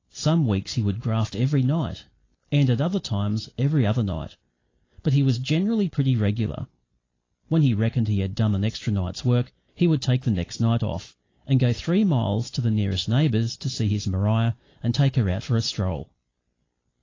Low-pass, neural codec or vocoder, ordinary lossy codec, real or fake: 7.2 kHz; none; AAC, 32 kbps; real